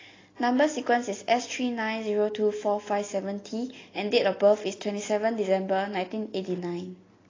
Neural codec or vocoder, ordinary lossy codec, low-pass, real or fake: none; AAC, 32 kbps; 7.2 kHz; real